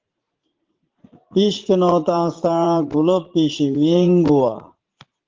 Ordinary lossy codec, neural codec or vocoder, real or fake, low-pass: Opus, 16 kbps; vocoder, 44.1 kHz, 80 mel bands, Vocos; fake; 7.2 kHz